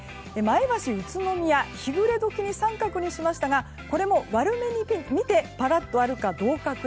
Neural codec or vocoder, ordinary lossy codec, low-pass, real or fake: none; none; none; real